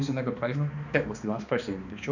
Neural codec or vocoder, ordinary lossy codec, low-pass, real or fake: codec, 16 kHz, 2 kbps, X-Codec, HuBERT features, trained on balanced general audio; none; 7.2 kHz; fake